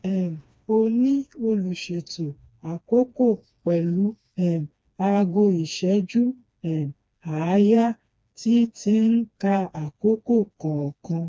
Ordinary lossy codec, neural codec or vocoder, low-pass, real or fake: none; codec, 16 kHz, 2 kbps, FreqCodec, smaller model; none; fake